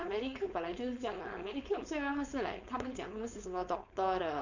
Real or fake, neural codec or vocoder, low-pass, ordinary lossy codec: fake; codec, 16 kHz, 4.8 kbps, FACodec; 7.2 kHz; none